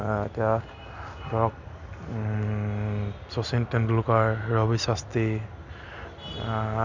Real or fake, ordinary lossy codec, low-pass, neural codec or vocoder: real; none; 7.2 kHz; none